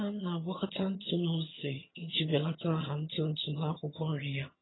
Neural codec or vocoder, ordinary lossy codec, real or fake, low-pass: vocoder, 22.05 kHz, 80 mel bands, HiFi-GAN; AAC, 16 kbps; fake; 7.2 kHz